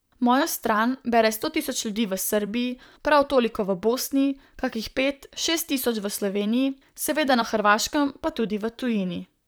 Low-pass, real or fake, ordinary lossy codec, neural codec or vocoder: none; fake; none; vocoder, 44.1 kHz, 128 mel bands, Pupu-Vocoder